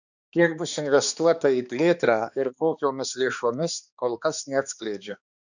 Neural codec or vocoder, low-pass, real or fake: codec, 16 kHz, 2 kbps, X-Codec, HuBERT features, trained on balanced general audio; 7.2 kHz; fake